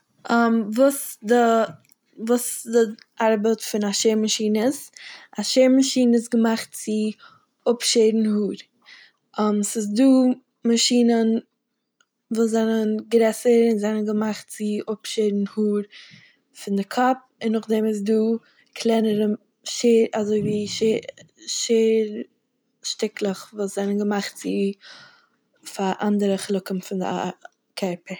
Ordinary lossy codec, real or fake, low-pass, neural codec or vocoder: none; real; none; none